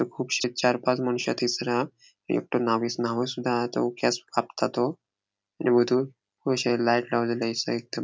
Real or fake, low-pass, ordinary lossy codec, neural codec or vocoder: real; none; none; none